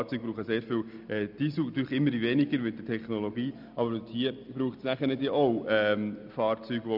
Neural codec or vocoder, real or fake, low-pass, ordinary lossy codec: none; real; 5.4 kHz; none